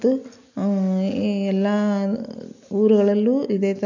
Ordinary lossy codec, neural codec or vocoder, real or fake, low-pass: AAC, 48 kbps; none; real; 7.2 kHz